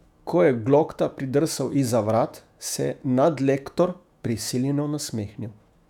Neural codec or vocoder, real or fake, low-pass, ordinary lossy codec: autoencoder, 48 kHz, 128 numbers a frame, DAC-VAE, trained on Japanese speech; fake; 19.8 kHz; none